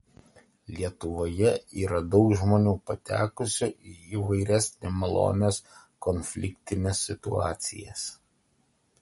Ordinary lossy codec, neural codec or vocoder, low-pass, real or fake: MP3, 48 kbps; none; 19.8 kHz; real